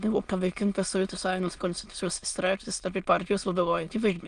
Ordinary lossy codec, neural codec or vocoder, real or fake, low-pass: Opus, 24 kbps; autoencoder, 22.05 kHz, a latent of 192 numbers a frame, VITS, trained on many speakers; fake; 9.9 kHz